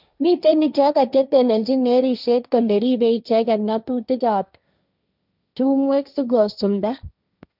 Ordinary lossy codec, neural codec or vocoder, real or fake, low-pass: none; codec, 16 kHz, 1.1 kbps, Voila-Tokenizer; fake; 5.4 kHz